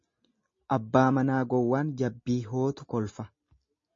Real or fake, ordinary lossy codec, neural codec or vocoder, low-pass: real; MP3, 32 kbps; none; 7.2 kHz